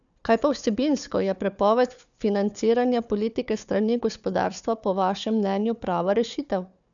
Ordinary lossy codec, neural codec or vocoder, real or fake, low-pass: none; codec, 16 kHz, 4 kbps, FunCodec, trained on Chinese and English, 50 frames a second; fake; 7.2 kHz